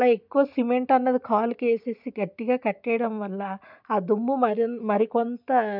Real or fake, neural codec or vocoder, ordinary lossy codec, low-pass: real; none; none; 5.4 kHz